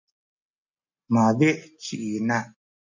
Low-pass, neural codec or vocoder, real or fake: 7.2 kHz; none; real